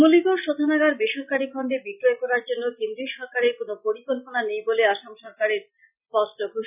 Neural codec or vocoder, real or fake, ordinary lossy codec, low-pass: none; real; none; 3.6 kHz